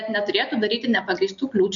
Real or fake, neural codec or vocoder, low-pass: real; none; 7.2 kHz